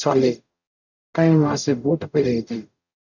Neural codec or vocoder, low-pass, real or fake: codec, 44.1 kHz, 0.9 kbps, DAC; 7.2 kHz; fake